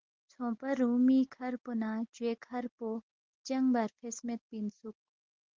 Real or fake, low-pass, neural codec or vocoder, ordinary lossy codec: real; 7.2 kHz; none; Opus, 16 kbps